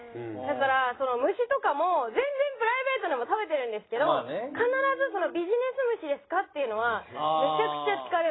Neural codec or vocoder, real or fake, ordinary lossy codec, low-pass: none; real; AAC, 16 kbps; 7.2 kHz